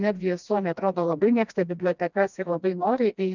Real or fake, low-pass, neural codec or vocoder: fake; 7.2 kHz; codec, 16 kHz, 1 kbps, FreqCodec, smaller model